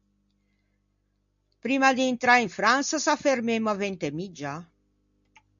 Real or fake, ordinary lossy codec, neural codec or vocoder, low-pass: real; MP3, 64 kbps; none; 7.2 kHz